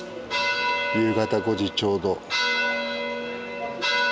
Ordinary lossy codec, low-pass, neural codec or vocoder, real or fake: none; none; none; real